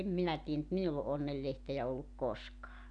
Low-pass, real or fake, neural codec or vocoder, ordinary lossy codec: 9.9 kHz; real; none; none